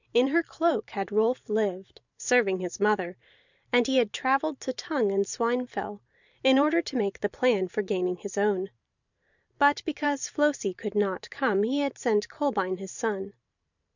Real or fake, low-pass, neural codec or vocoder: fake; 7.2 kHz; vocoder, 44.1 kHz, 128 mel bands every 512 samples, BigVGAN v2